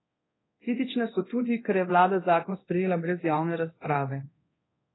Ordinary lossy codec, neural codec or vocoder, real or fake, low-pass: AAC, 16 kbps; codec, 24 kHz, 0.9 kbps, DualCodec; fake; 7.2 kHz